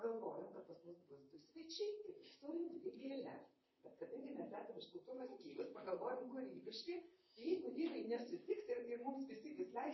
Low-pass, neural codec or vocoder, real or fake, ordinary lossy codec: 7.2 kHz; vocoder, 44.1 kHz, 128 mel bands, Pupu-Vocoder; fake; MP3, 24 kbps